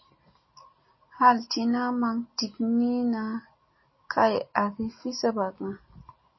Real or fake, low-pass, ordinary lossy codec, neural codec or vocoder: real; 7.2 kHz; MP3, 24 kbps; none